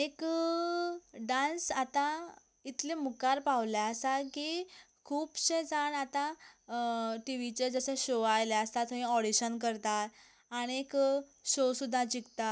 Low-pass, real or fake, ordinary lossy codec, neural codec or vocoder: none; real; none; none